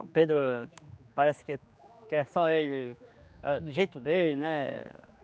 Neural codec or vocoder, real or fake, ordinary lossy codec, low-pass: codec, 16 kHz, 2 kbps, X-Codec, HuBERT features, trained on general audio; fake; none; none